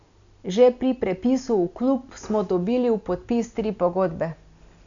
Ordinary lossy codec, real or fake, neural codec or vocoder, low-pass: none; real; none; 7.2 kHz